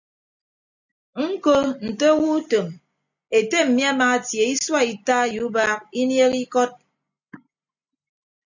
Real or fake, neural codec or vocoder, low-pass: real; none; 7.2 kHz